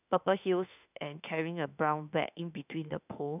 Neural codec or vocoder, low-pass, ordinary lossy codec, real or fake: autoencoder, 48 kHz, 32 numbers a frame, DAC-VAE, trained on Japanese speech; 3.6 kHz; none; fake